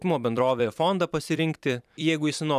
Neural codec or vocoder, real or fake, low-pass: vocoder, 44.1 kHz, 128 mel bands every 256 samples, BigVGAN v2; fake; 14.4 kHz